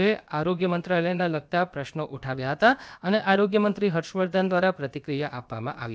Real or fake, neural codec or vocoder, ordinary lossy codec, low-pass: fake; codec, 16 kHz, about 1 kbps, DyCAST, with the encoder's durations; none; none